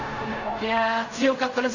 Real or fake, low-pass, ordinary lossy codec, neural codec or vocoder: fake; 7.2 kHz; none; codec, 16 kHz in and 24 kHz out, 0.4 kbps, LongCat-Audio-Codec, fine tuned four codebook decoder